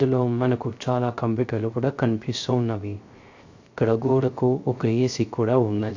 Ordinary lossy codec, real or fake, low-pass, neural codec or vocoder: AAC, 48 kbps; fake; 7.2 kHz; codec, 16 kHz, 0.3 kbps, FocalCodec